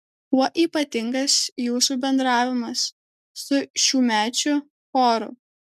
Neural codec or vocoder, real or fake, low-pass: none; real; 14.4 kHz